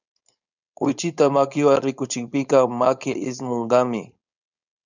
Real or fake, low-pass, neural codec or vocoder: fake; 7.2 kHz; codec, 16 kHz, 4.8 kbps, FACodec